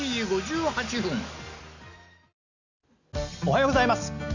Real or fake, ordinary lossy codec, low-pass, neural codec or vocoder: real; none; 7.2 kHz; none